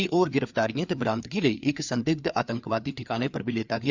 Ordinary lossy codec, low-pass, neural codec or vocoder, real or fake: Opus, 64 kbps; 7.2 kHz; codec, 16 kHz, 4 kbps, FreqCodec, larger model; fake